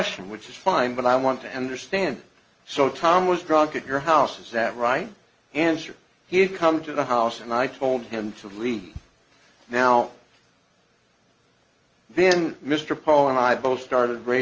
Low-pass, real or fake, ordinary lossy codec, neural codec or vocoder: 7.2 kHz; real; Opus, 24 kbps; none